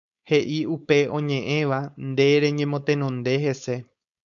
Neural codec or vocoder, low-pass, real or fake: codec, 16 kHz, 4.8 kbps, FACodec; 7.2 kHz; fake